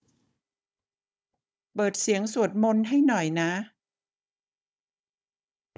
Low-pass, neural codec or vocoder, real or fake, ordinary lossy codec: none; codec, 16 kHz, 16 kbps, FunCodec, trained on Chinese and English, 50 frames a second; fake; none